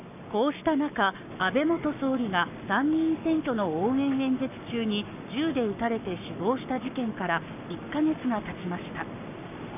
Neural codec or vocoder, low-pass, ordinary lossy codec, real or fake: codec, 44.1 kHz, 7.8 kbps, Pupu-Codec; 3.6 kHz; none; fake